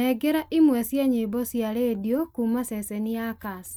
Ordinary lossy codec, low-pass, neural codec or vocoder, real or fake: none; none; none; real